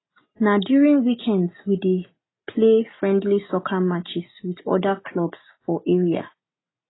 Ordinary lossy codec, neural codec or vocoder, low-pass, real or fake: AAC, 16 kbps; none; 7.2 kHz; real